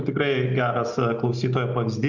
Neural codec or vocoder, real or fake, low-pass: none; real; 7.2 kHz